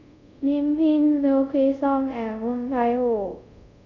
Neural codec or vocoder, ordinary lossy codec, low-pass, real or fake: codec, 24 kHz, 0.5 kbps, DualCodec; none; 7.2 kHz; fake